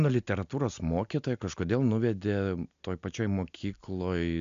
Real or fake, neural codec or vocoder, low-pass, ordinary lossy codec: real; none; 7.2 kHz; MP3, 64 kbps